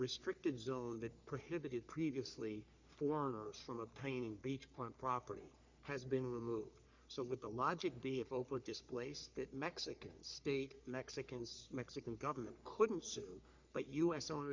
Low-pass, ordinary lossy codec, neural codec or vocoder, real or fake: 7.2 kHz; Opus, 64 kbps; codec, 44.1 kHz, 3.4 kbps, Pupu-Codec; fake